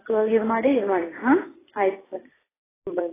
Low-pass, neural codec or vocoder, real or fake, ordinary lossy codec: 3.6 kHz; vocoder, 44.1 kHz, 128 mel bands every 256 samples, BigVGAN v2; fake; AAC, 16 kbps